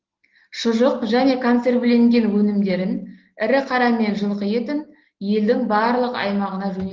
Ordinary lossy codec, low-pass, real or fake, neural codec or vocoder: Opus, 16 kbps; 7.2 kHz; real; none